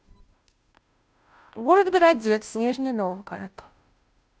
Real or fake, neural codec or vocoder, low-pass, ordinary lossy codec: fake; codec, 16 kHz, 0.5 kbps, FunCodec, trained on Chinese and English, 25 frames a second; none; none